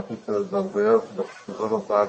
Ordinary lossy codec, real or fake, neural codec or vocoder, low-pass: MP3, 32 kbps; fake; codec, 44.1 kHz, 1.7 kbps, Pupu-Codec; 9.9 kHz